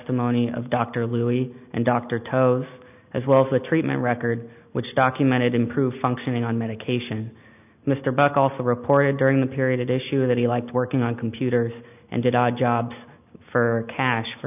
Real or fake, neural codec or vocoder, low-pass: real; none; 3.6 kHz